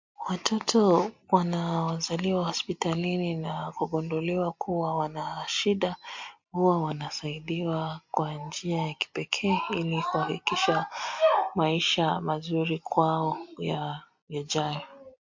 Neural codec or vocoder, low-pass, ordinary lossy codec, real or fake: none; 7.2 kHz; MP3, 48 kbps; real